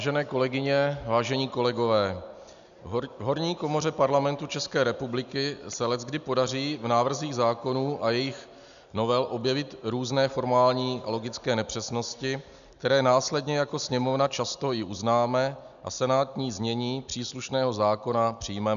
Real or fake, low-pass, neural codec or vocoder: real; 7.2 kHz; none